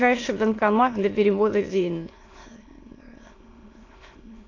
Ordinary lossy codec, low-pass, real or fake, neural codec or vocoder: AAC, 32 kbps; 7.2 kHz; fake; autoencoder, 22.05 kHz, a latent of 192 numbers a frame, VITS, trained on many speakers